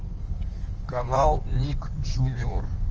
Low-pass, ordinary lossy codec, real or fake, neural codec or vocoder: 7.2 kHz; Opus, 24 kbps; fake; codec, 16 kHz in and 24 kHz out, 1.1 kbps, FireRedTTS-2 codec